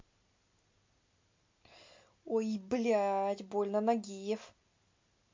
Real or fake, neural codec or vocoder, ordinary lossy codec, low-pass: real; none; MP3, 48 kbps; 7.2 kHz